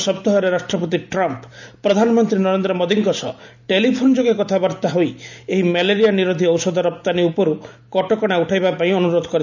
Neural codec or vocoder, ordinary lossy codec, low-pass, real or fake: none; none; 7.2 kHz; real